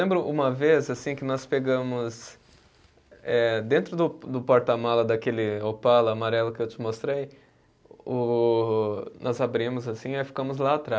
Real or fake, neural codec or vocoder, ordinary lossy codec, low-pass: real; none; none; none